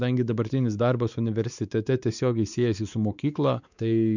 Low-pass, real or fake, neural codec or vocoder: 7.2 kHz; fake; codec, 16 kHz, 4 kbps, X-Codec, WavLM features, trained on Multilingual LibriSpeech